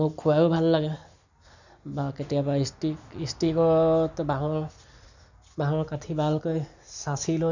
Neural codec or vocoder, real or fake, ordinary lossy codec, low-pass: none; real; none; 7.2 kHz